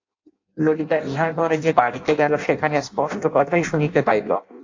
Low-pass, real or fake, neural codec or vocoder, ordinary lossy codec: 7.2 kHz; fake; codec, 16 kHz in and 24 kHz out, 0.6 kbps, FireRedTTS-2 codec; AAC, 48 kbps